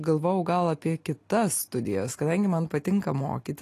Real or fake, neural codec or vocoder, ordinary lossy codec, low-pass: real; none; AAC, 48 kbps; 14.4 kHz